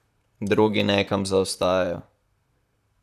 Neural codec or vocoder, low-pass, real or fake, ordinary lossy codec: vocoder, 44.1 kHz, 128 mel bands, Pupu-Vocoder; 14.4 kHz; fake; none